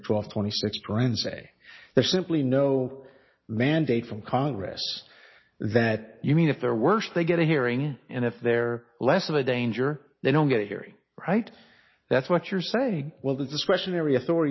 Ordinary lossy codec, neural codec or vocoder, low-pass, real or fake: MP3, 24 kbps; none; 7.2 kHz; real